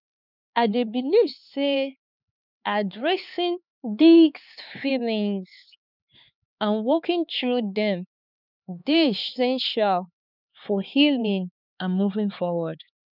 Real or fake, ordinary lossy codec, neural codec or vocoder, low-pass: fake; none; codec, 16 kHz, 4 kbps, X-Codec, HuBERT features, trained on LibriSpeech; 5.4 kHz